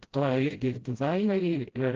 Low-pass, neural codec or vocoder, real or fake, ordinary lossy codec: 7.2 kHz; codec, 16 kHz, 0.5 kbps, FreqCodec, smaller model; fake; Opus, 16 kbps